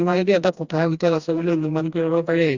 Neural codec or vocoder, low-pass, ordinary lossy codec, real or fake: codec, 16 kHz, 1 kbps, FreqCodec, smaller model; 7.2 kHz; Opus, 64 kbps; fake